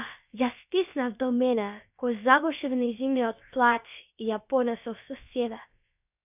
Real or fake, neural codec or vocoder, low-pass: fake; codec, 16 kHz, about 1 kbps, DyCAST, with the encoder's durations; 3.6 kHz